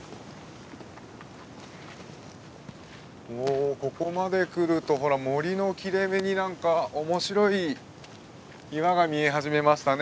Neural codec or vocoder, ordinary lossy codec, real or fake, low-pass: none; none; real; none